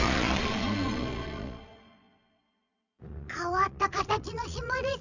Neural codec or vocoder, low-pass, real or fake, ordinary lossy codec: vocoder, 22.05 kHz, 80 mel bands, Vocos; 7.2 kHz; fake; none